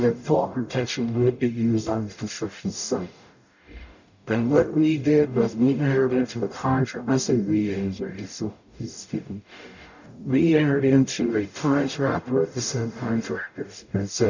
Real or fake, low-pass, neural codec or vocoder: fake; 7.2 kHz; codec, 44.1 kHz, 0.9 kbps, DAC